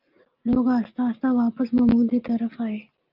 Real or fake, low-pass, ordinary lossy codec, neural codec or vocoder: real; 5.4 kHz; Opus, 24 kbps; none